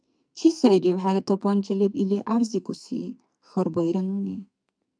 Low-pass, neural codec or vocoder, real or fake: 9.9 kHz; codec, 44.1 kHz, 2.6 kbps, SNAC; fake